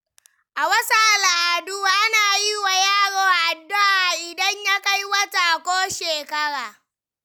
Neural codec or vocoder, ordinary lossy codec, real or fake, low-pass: none; none; real; none